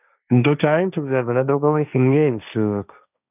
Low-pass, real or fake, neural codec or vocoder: 3.6 kHz; fake; codec, 16 kHz, 1.1 kbps, Voila-Tokenizer